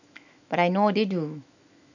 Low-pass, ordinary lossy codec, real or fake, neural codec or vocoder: 7.2 kHz; none; fake; autoencoder, 48 kHz, 128 numbers a frame, DAC-VAE, trained on Japanese speech